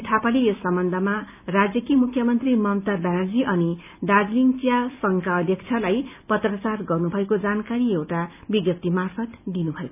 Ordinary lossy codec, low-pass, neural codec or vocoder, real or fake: none; 3.6 kHz; none; real